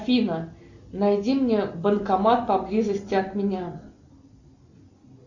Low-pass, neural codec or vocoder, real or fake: 7.2 kHz; none; real